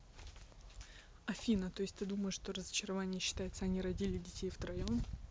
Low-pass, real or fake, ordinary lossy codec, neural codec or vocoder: none; real; none; none